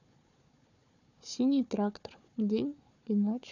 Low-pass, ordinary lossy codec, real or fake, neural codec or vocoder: 7.2 kHz; none; fake; codec, 16 kHz, 4 kbps, FunCodec, trained on Chinese and English, 50 frames a second